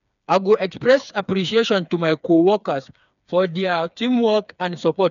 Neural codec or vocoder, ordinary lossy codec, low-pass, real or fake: codec, 16 kHz, 4 kbps, FreqCodec, smaller model; none; 7.2 kHz; fake